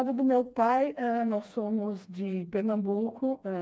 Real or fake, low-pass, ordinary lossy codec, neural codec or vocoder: fake; none; none; codec, 16 kHz, 2 kbps, FreqCodec, smaller model